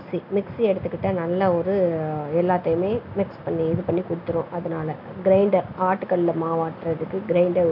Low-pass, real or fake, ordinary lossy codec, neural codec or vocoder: 5.4 kHz; real; none; none